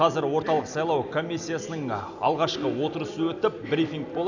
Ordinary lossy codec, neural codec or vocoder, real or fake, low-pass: none; none; real; 7.2 kHz